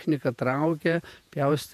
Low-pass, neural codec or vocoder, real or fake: 14.4 kHz; vocoder, 48 kHz, 128 mel bands, Vocos; fake